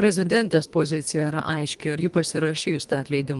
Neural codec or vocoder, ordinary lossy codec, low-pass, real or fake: codec, 24 kHz, 1.5 kbps, HILCodec; Opus, 32 kbps; 10.8 kHz; fake